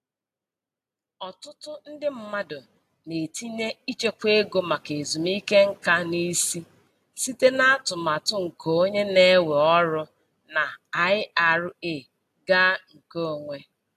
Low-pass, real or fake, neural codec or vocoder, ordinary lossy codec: 14.4 kHz; real; none; AAC, 64 kbps